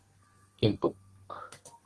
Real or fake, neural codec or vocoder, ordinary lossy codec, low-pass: fake; codec, 32 kHz, 1.9 kbps, SNAC; Opus, 16 kbps; 10.8 kHz